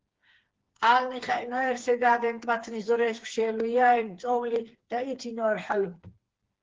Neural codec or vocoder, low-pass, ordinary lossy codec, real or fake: codec, 16 kHz, 4 kbps, FreqCodec, smaller model; 7.2 kHz; Opus, 16 kbps; fake